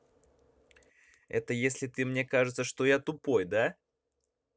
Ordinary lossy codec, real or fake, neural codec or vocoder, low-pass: none; real; none; none